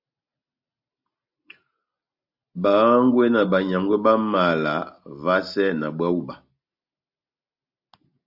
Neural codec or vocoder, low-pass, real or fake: none; 5.4 kHz; real